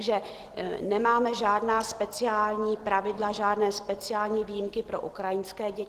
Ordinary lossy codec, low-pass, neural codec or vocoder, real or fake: Opus, 16 kbps; 14.4 kHz; none; real